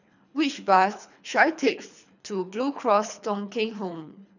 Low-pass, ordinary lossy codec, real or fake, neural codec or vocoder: 7.2 kHz; none; fake; codec, 24 kHz, 3 kbps, HILCodec